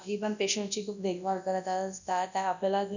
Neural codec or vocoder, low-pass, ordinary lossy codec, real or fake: codec, 24 kHz, 0.9 kbps, WavTokenizer, large speech release; 7.2 kHz; none; fake